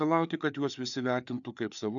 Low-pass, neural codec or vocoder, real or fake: 7.2 kHz; codec, 16 kHz, 4 kbps, FreqCodec, larger model; fake